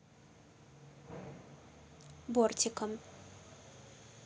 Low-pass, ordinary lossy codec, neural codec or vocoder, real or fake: none; none; none; real